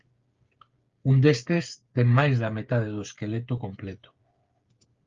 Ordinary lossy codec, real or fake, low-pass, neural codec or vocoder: Opus, 32 kbps; fake; 7.2 kHz; codec, 16 kHz, 8 kbps, FreqCodec, smaller model